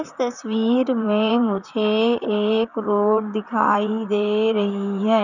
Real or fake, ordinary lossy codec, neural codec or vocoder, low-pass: fake; none; vocoder, 44.1 kHz, 128 mel bands, Pupu-Vocoder; 7.2 kHz